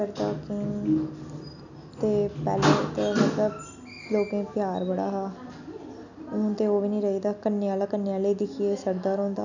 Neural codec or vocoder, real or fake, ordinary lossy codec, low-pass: none; real; none; 7.2 kHz